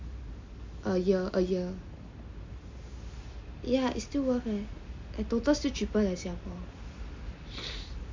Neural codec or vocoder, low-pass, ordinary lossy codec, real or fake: none; 7.2 kHz; MP3, 64 kbps; real